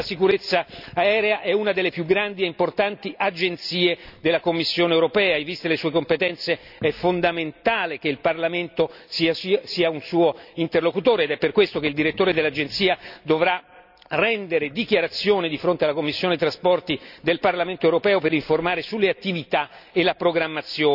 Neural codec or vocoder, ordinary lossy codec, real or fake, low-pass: none; none; real; 5.4 kHz